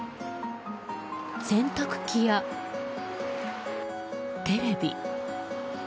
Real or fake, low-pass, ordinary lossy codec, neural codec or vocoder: real; none; none; none